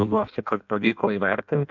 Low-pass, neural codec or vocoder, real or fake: 7.2 kHz; codec, 16 kHz in and 24 kHz out, 0.6 kbps, FireRedTTS-2 codec; fake